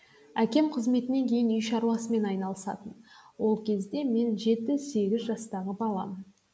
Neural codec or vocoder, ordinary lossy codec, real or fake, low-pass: none; none; real; none